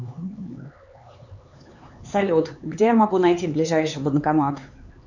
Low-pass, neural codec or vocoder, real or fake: 7.2 kHz; codec, 16 kHz, 4 kbps, X-Codec, HuBERT features, trained on LibriSpeech; fake